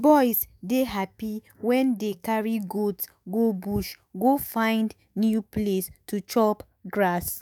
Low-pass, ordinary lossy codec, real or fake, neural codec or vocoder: none; none; fake; autoencoder, 48 kHz, 128 numbers a frame, DAC-VAE, trained on Japanese speech